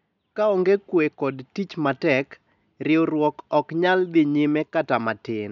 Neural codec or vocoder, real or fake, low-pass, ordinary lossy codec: none; real; 7.2 kHz; none